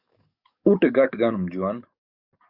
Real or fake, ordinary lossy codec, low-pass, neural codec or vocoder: fake; Opus, 64 kbps; 5.4 kHz; codec, 44.1 kHz, 7.8 kbps, DAC